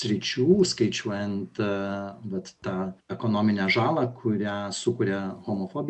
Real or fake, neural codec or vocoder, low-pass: real; none; 10.8 kHz